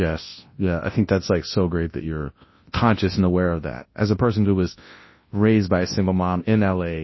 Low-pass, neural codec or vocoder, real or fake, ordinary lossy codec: 7.2 kHz; codec, 24 kHz, 0.9 kbps, WavTokenizer, large speech release; fake; MP3, 24 kbps